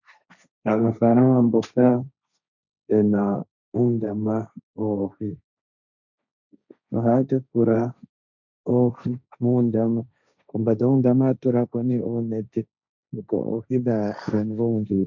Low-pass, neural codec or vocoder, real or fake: 7.2 kHz; codec, 16 kHz, 1.1 kbps, Voila-Tokenizer; fake